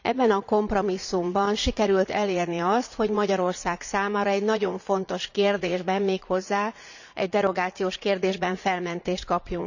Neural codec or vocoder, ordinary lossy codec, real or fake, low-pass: vocoder, 44.1 kHz, 80 mel bands, Vocos; none; fake; 7.2 kHz